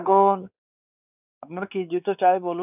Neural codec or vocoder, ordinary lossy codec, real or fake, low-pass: codec, 16 kHz, 4 kbps, X-Codec, WavLM features, trained on Multilingual LibriSpeech; none; fake; 3.6 kHz